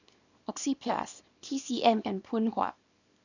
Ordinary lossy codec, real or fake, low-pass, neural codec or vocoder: none; fake; 7.2 kHz; codec, 24 kHz, 0.9 kbps, WavTokenizer, small release